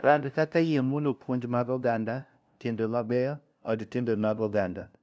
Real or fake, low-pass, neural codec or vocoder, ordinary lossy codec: fake; none; codec, 16 kHz, 0.5 kbps, FunCodec, trained on LibriTTS, 25 frames a second; none